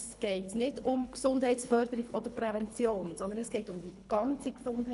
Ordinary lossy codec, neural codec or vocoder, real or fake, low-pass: none; codec, 24 kHz, 3 kbps, HILCodec; fake; 10.8 kHz